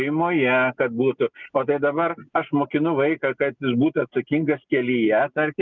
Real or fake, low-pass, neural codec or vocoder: real; 7.2 kHz; none